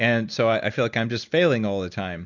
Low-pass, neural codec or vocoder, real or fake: 7.2 kHz; none; real